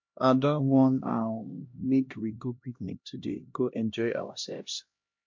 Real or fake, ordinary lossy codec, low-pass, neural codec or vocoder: fake; MP3, 48 kbps; 7.2 kHz; codec, 16 kHz, 1 kbps, X-Codec, HuBERT features, trained on LibriSpeech